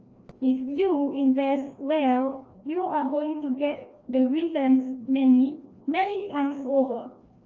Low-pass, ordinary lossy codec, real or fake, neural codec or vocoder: 7.2 kHz; Opus, 24 kbps; fake; codec, 16 kHz, 1 kbps, FreqCodec, larger model